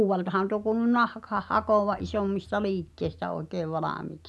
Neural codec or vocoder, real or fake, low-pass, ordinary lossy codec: none; real; none; none